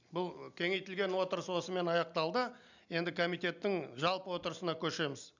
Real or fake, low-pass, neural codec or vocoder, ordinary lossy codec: real; 7.2 kHz; none; none